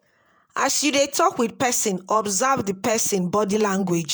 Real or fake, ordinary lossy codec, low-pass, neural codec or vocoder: fake; none; none; vocoder, 48 kHz, 128 mel bands, Vocos